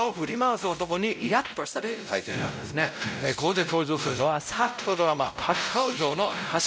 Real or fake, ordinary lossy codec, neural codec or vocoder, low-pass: fake; none; codec, 16 kHz, 0.5 kbps, X-Codec, WavLM features, trained on Multilingual LibriSpeech; none